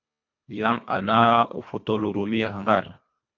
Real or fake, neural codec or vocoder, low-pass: fake; codec, 24 kHz, 1.5 kbps, HILCodec; 7.2 kHz